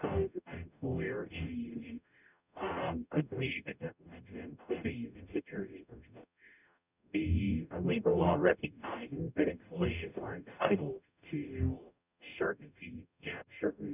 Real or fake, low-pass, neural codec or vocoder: fake; 3.6 kHz; codec, 44.1 kHz, 0.9 kbps, DAC